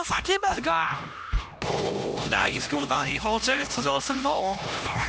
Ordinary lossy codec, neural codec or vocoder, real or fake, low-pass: none; codec, 16 kHz, 1 kbps, X-Codec, HuBERT features, trained on LibriSpeech; fake; none